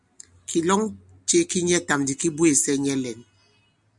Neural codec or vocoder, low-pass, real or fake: none; 10.8 kHz; real